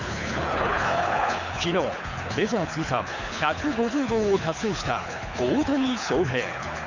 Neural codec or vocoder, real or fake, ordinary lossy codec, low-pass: codec, 24 kHz, 6 kbps, HILCodec; fake; none; 7.2 kHz